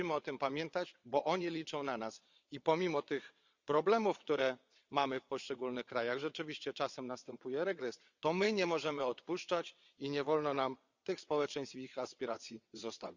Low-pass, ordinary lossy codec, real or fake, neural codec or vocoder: 7.2 kHz; Opus, 64 kbps; fake; vocoder, 22.05 kHz, 80 mel bands, WaveNeXt